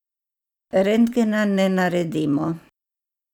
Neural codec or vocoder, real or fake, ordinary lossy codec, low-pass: vocoder, 44.1 kHz, 128 mel bands every 512 samples, BigVGAN v2; fake; none; 19.8 kHz